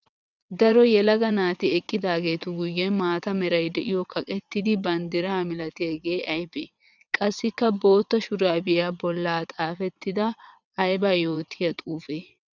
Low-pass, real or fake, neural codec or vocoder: 7.2 kHz; fake; vocoder, 22.05 kHz, 80 mel bands, WaveNeXt